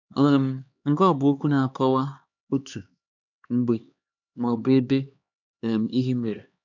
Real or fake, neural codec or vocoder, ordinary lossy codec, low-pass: fake; codec, 16 kHz, 2 kbps, X-Codec, HuBERT features, trained on LibriSpeech; none; 7.2 kHz